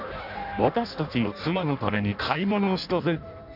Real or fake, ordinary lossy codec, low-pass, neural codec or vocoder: fake; none; 5.4 kHz; codec, 16 kHz in and 24 kHz out, 0.6 kbps, FireRedTTS-2 codec